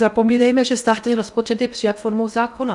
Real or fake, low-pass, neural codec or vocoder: fake; 10.8 kHz; codec, 16 kHz in and 24 kHz out, 0.8 kbps, FocalCodec, streaming, 65536 codes